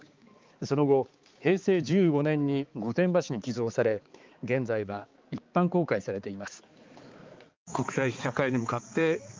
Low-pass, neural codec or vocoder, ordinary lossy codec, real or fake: 7.2 kHz; codec, 16 kHz, 4 kbps, X-Codec, HuBERT features, trained on balanced general audio; Opus, 24 kbps; fake